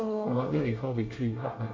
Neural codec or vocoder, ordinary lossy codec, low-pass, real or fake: codec, 24 kHz, 1 kbps, SNAC; MP3, 48 kbps; 7.2 kHz; fake